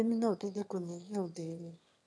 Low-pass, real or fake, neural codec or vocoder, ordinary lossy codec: none; fake; autoencoder, 22.05 kHz, a latent of 192 numbers a frame, VITS, trained on one speaker; none